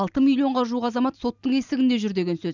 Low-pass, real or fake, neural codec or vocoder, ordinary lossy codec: 7.2 kHz; real; none; none